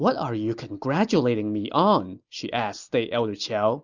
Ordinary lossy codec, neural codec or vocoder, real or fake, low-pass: Opus, 64 kbps; none; real; 7.2 kHz